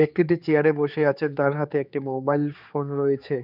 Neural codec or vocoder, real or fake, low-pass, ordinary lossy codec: codec, 16 kHz, 4 kbps, X-Codec, HuBERT features, trained on general audio; fake; 5.4 kHz; none